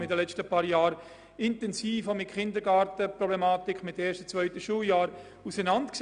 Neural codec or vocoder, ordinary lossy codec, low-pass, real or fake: none; none; 9.9 kHz; real